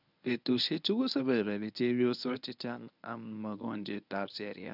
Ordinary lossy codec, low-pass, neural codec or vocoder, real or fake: none; 5.4 kHz; codec, 24 kHz, 0.9 kbps, WavTokenizer, medium speech release version 1; fake